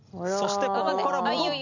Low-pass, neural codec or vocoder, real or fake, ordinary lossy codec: 7.2 kHz; none; real; none